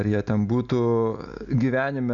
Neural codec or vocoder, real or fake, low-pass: none; real; 7.2 kHz